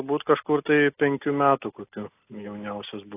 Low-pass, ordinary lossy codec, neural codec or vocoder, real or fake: 3.6 kHz; AAC, 24 kbps; none; real